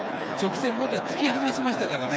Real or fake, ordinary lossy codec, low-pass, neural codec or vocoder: fake; none; none; codec, 16 kHz, 4 kbps, FreqCodec, smaller model